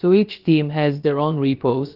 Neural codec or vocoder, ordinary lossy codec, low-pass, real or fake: codec, 16 kHz, about 1 kbps, DyCAST, with the encoder's durations; Opus, 16 kbps; 5.4 kHz; fake